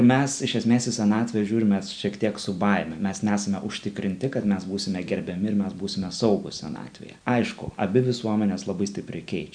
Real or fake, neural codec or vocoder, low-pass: real; none; 9.9 kHz